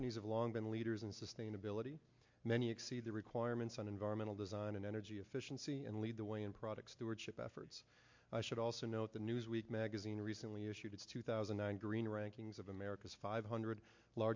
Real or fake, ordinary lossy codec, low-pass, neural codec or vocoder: real; MP3, 48 kbps; 7.2 kHz; none